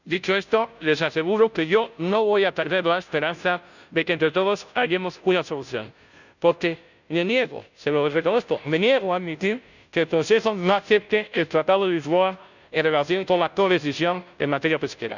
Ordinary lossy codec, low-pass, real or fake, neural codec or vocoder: none; 7.2 kHz; fake; codec, 16 kHz, 0.5 kbps, FunCodec, trained on Chinese and English, 25 frames a second